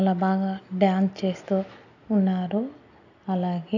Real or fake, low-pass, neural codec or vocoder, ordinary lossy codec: real; 7.2 kHz; none; none